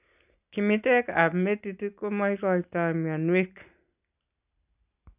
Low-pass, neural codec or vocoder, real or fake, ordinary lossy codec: 3.6 kHz; none; real; none